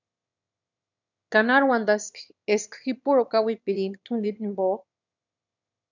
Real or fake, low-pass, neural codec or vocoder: fake; 7.2 kHz; autoencoder, 22.05 kHz, a latent of 192 numbers a frame, VITS, trained on one speaker